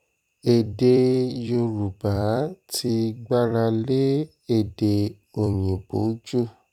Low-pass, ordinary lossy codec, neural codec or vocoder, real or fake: 19.8 kHz; none; vocoder, 44.1 kHz, 128 mel bands every 256 samples, BigVGAN v2; fake